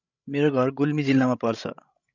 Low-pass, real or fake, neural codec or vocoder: 7.2 kHz; fake; codec, 16 kHz, 16 kbps, FreqCodec, larger model